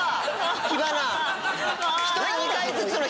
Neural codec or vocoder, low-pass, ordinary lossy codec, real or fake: none; none; none; real